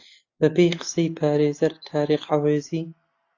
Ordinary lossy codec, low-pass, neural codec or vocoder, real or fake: AAC, 48 kbps; 7.2 kHz; none; real